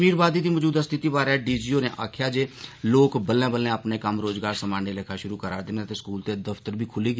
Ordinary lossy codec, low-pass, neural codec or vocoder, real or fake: none; none; none; real